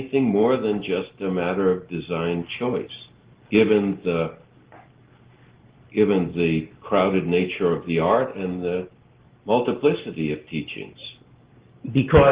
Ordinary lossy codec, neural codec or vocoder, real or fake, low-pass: Opus, 16 kbps; none; real; 3.6 kHz